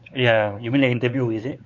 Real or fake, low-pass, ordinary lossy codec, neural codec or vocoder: fake; 7.2 kHz; none; codec, 16 kHz, 4 kbps, X-Codec, WavLM features, trained on Multilingual LibriSpeech